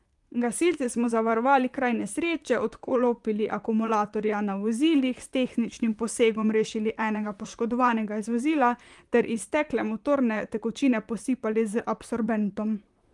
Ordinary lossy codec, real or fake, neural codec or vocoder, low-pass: Opus, 32 kbps; fake; vocoder, 44.1 kHz, 128 mel bands, Pupu-Vocoder; 10.8 kHz